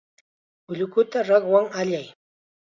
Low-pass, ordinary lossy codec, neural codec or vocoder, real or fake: 7.2 kHz; Opus, 64 kbps; none; real